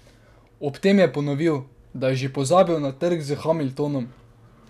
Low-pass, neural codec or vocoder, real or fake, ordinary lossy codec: 14.4 kHz; none; real; none